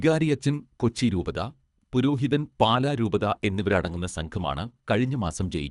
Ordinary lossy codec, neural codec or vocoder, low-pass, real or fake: none; codec, 24 kHz, 3 kbps, HILCodec; 10.8 kHz; fake